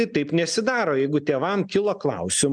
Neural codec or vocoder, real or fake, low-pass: none; real; 9.9 kHz